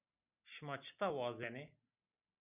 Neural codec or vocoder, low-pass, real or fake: none; 3.6 kHz; real